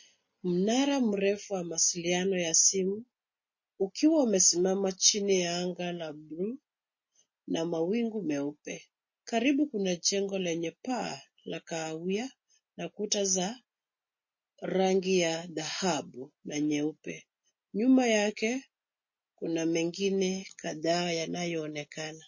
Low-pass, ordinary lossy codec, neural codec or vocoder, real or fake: 7.2 kHz; MP3, 32 kbps; none; real